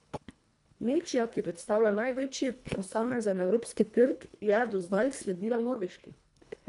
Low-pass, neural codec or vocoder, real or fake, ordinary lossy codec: 10.8 kHz; codec, 24 kHz, 1.5 kbps, HILCodec; fake; none